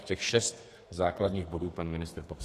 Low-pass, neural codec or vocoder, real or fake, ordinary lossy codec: 14.4 kHz; codec, 32 kHz, 1.9 kbps, SNAC; fake; AAC, 48 kbps